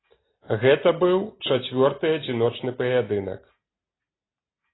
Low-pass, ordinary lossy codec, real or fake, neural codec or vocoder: 7.2 kHz; AAC, 16 kbps; real; none